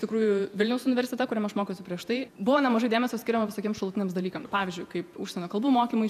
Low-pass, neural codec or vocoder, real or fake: 14.4 kHz; vocoder, 48 kHz, 128 mel bands, Vocos; fake